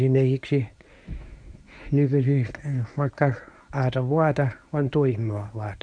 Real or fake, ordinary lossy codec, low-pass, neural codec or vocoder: fake; none; 9.9 kHz; codec, 24 kHz, 0.9 kbps, WavTokenizer, medium speech release version 1